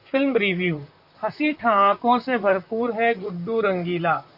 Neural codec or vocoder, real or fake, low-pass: vocoder, 44.1 kHz, 128 mel bands, Pupu-Vocoder; fake; 5.4 kHz